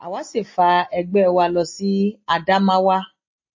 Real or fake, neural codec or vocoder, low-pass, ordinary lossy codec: real; none; 7.2 kHz; MP3, 32 kbps